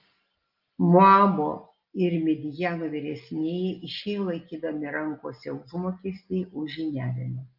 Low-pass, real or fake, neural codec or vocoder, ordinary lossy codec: 5.4 kHz; real; none; Opus, 24 kbps